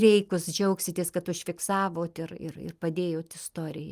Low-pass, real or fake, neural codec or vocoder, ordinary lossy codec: 14.4 kHz; real; none; Opus, 32 kbps